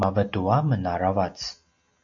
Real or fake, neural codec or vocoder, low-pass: real; none; 7.2 kHz